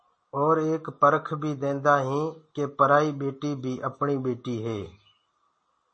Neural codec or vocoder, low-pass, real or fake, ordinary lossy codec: none; 9.9 kHz; real; MP3, 32 kbps